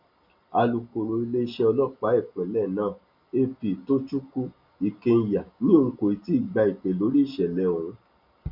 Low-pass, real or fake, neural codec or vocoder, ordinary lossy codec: 5.4 kHz; real; none; none